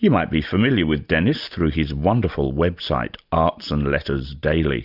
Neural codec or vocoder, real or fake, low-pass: none; real; 5.4 kHz